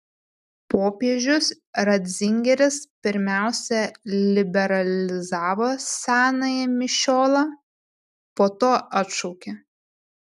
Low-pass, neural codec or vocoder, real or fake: 14.4 kHz; none; real